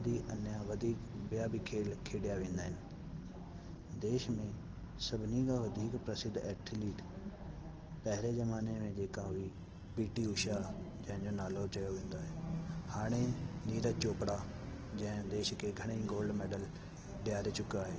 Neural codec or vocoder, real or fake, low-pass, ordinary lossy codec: none; real; 7.2 kHz; Opus, 16 kbps